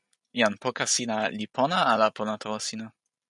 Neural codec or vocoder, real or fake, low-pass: none; real; 10.8 kHz